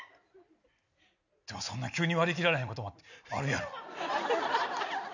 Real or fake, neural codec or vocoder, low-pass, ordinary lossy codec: real; none; 7.2 kHz; none